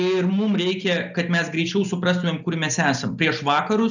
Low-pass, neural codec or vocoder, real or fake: 7.2 kHz; none; real